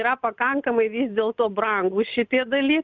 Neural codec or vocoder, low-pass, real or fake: none; 7.2 kHz; real